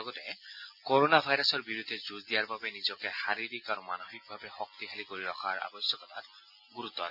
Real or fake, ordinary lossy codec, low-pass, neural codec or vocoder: real; none; 5.4 kHz; none